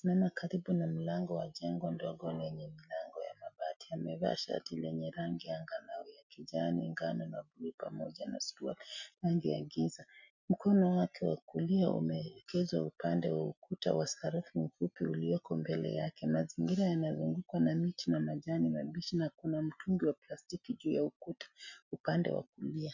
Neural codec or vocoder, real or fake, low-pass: none; real; 7.2 kHz